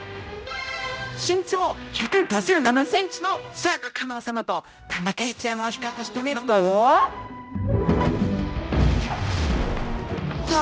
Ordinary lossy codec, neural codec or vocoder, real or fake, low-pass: none; codec, 16 kHz, 0.5 kbps, X-Codec, HuBERT features, trained on general audio; fake; none